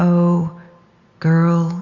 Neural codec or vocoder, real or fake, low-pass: none; real; 7.2 kHz